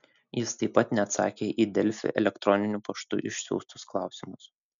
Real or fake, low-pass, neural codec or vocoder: real; 7.2 kHz; none